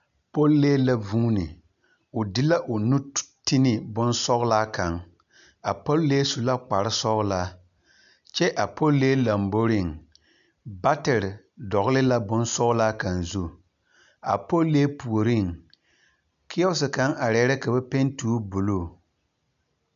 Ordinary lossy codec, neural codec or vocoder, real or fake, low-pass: MP3, 96 kbps; none; real; 7.2 kHz